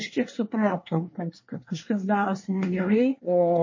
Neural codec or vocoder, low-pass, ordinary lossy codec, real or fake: codec, 24 kHz, 1 kbps, SNAC; 7.2 kHz; MP3, 32 kbps; fake